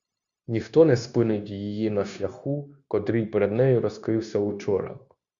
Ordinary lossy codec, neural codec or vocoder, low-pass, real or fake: Opus, 64 kbps; codec, 16 kHz, 0.9 kbps, LongCat-Audio-Codec; 7.2 kHz; fake